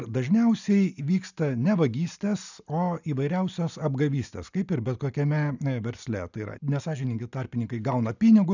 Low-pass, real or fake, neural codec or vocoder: 7.2 kHz; real; none